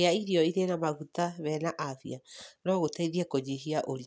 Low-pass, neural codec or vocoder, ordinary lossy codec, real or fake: none; none; none; real